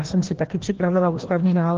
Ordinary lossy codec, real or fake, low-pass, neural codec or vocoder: Opus, 24 kbps; fake; 7.2 kHz; codec, 16 kHz, 1 kbps, FreqCodec, larger model